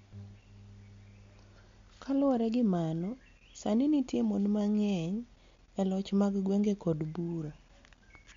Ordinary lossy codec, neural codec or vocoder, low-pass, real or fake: MP3, 48 kbps; none; 7.2 kHz; real